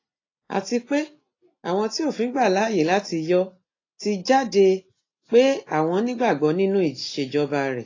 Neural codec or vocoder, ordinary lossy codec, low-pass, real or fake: none; AAC, 32 kbps; 7.2 kHz; real